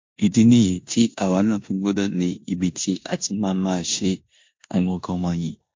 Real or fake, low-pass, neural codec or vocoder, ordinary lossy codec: fake; 7.2 kHz; codec, 16 kHz in and 24 kHz out, 0.9 kbps, LongCat-Audio-Codec, four codebook decoder; MP3, 48 kbps